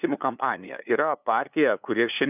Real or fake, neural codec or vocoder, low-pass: fake; codec, 16 kHz, 2 kbps, FunCodec, trained on LibriTTS, 25 frames a second; 3.6 kHz